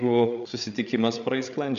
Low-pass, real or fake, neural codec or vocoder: 7.2 kHz; fake; codec, 16 kHz, 8 kbps, FreqCodec, larger model